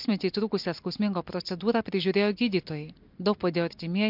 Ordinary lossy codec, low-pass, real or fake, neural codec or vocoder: MP3, 48 kbps; 5.4 kHz; real; none